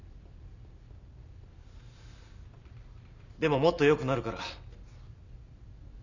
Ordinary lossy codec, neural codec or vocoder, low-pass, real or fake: none; none; 7.2 kHz; real